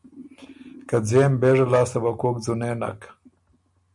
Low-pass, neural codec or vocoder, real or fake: 10.8 kHz; none; real